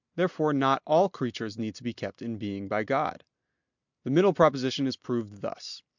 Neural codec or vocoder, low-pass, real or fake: none; 7.2 kHz; real